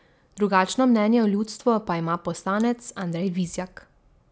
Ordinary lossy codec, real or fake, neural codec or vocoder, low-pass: none; real; none; none